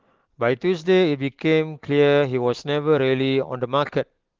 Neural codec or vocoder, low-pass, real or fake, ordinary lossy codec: none; 7.2 kHz; real; Opus, 16 kbps